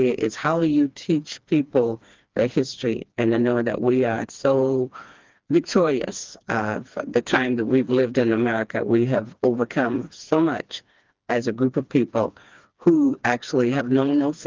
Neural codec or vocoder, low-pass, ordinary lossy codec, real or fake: codec, 16 kHz, 2 kbps, FreqCodec, smaller model; 7.2 kHz; Opus, 32 kbps; fake